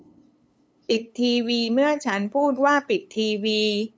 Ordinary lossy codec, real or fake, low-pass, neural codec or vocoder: none; fake; none; codec, 16 kHz, 8 kbps, FunCodec, trained on LibriTTS, 25 frames a second